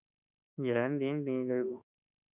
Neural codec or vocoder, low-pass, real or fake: autoencoder, 48 kHz, 32 numbers a frame, DAC-VAE, trained on Japanese speech; 3.6 kHz; fake